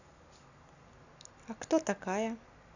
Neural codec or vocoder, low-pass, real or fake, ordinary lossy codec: none; 7.2 kHz; real; none